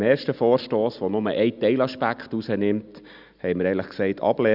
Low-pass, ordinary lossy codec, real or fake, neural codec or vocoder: 5.4 kHz; none; real; none